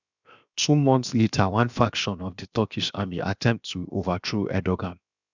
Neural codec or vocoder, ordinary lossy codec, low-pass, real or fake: codec, 16 kHz, 0.7 kbps, FocalCodec; none; 7.2 kHz; fake